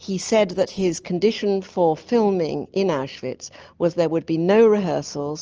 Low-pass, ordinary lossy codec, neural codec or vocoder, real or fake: 7.2 kHz; Opus, 24 kbps; none; real